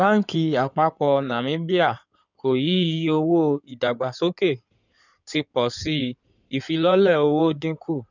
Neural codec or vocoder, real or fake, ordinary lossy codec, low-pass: codec, 16 kHz in and 24 kHz out, 2.2 kbps, FireRedTTS-2 codec; fake; none; 7.2 kHz